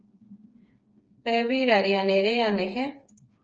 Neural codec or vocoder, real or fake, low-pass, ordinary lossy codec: codec, 16 kHz, 4 kbps, FreqCodec, smaller model; fake; 7.2 kHz; Opus, 24 kbps